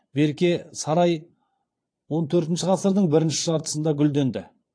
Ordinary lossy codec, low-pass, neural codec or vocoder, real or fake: AAC, 48 kbps; 9.9 kHz; vocoder, 22.05 kHz, 80 mel bands, Vocos; fake